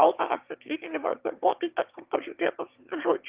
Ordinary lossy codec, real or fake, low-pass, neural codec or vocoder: Opus, 24 kbps; fake; 3.6 kHz; autoencoder, 22.05 kHz, a latent of 192 numbers a frame, VITS, trained on one speaker